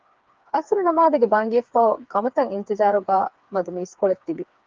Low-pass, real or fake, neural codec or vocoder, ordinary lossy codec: 7.2 kHz; fake; codec, 16 kHz, 4 kbps, FreqCodec, smaller model; Opus, 24 kbps